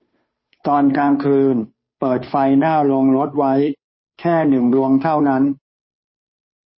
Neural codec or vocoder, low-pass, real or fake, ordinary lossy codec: codec, 16 kHz, 2 kbps, FunCodec, trained on Chinese and English, 25 frames a second; 7.2 kHz; fake; MP3, 24 kbps